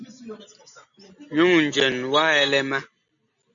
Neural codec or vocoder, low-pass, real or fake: none; 7.2 kHz; real